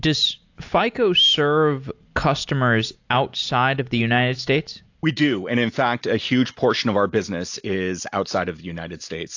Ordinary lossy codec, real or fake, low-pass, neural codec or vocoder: AAC, 48 kbps; real; 7.2 kHz; none